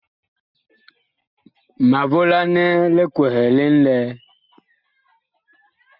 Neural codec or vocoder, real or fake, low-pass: none; real; 5.4 kHz